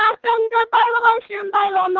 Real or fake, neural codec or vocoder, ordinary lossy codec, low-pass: fake; codec, 24 kHz, 3 kbps, HILCodec; Opus, 32 kbps; 7.2 kHz